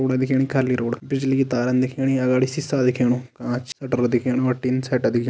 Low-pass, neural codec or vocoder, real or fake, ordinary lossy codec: none; none; real; none